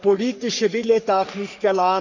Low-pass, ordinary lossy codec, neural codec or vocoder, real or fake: 7.2 kHz; none; codec, 44.1 kHz, 3.4 kbps, Pupu-Codec; fake